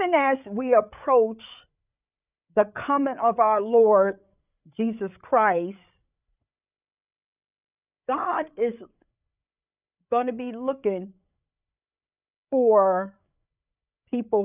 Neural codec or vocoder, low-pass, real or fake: codec, 16 kHz, 8 kbps, FreqCodec, larger model; 3.6 kHz; fake